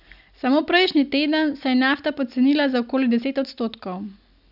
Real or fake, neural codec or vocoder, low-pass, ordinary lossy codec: real; none; 5.4 kHz; none